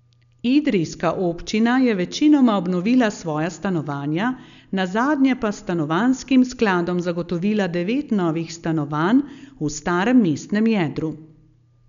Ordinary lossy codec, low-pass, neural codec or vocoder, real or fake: none; 7.2 kHz; none; real